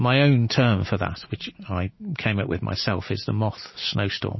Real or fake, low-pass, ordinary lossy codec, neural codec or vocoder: real; 7.2 kHz; MP3, 24 kbps; none